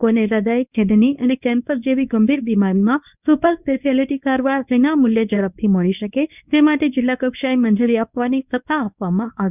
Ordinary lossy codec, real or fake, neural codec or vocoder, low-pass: none; fake; codec, 24 kHz, 0.9 kbps, WavTokenizer, medium speech release version 1; 3.6 kHz